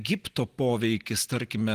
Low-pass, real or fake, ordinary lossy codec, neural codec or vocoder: 14.4 kHz; real; Opus, 24 kbps; none